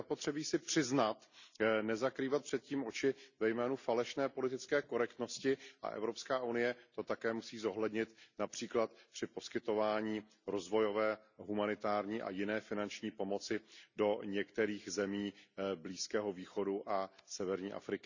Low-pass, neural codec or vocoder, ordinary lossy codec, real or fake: 7.2 kHz; none; none; real